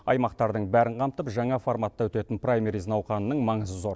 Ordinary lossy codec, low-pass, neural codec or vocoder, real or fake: none; none; none; real